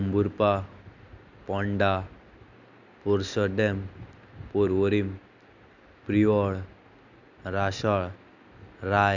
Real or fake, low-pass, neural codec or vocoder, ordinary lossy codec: real; 7.2 kHz; none; none